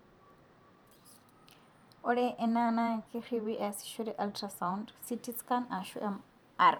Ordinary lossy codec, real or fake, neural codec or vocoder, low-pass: none; fake; vocoder, 44.1 kHz, 128 mel bands every 512 samples, BigVGAN v2; none